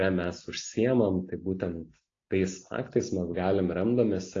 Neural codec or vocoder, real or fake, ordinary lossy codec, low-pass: none; real; AAC, 32 kbps; 7.2 kHz